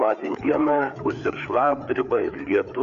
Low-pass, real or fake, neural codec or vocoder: 7.2 kHz; fake; codec, 16 kHz, 4 kbps, FreqCodec, larger model